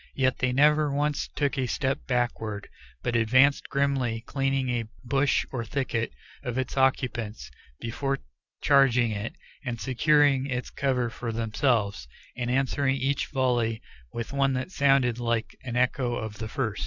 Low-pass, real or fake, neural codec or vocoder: 7.2 kHz; real; none